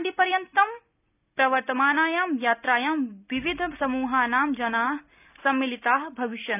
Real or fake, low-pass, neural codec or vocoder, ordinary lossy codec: real; 3.6 kHz; none; none